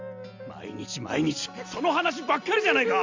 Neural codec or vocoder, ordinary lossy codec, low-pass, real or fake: none; AAC, 48 kbps; 7.2 kHz; real